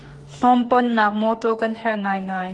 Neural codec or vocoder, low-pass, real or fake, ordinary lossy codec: autoencoder, 48 kHz, 32 numbers a frame, DAC-VAE, trained on Japanese speech; 10.8 kHz; fake; Opus, 32 kbps